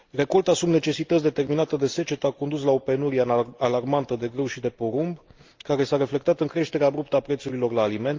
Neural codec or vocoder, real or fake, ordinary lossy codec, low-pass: none; real; Opus, 32 kbps; 7.2 kHz